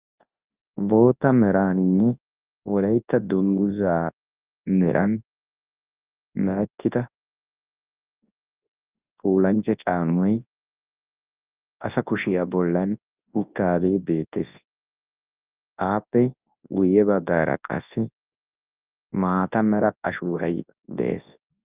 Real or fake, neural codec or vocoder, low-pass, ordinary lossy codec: fake; codec, 24 kHz, 0.9 kbps, WavTokenizer, large speech release; 3.6 kHz; Opus, 24 kbps